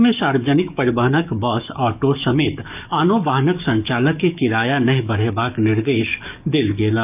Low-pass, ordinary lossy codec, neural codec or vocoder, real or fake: 3.6 kHz; none; codec, 44.1 kHz, 7.8 kbps, DAC; fake